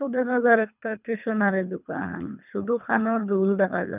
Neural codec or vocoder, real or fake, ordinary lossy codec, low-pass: codec, 24 kHz, 3 kbps, HILCodec; fake; none; 3.6 kHz